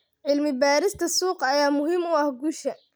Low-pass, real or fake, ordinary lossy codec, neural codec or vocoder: none; real; none; none